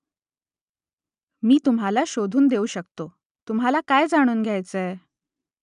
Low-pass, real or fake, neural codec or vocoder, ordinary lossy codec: 10.8 kHz; real; none; none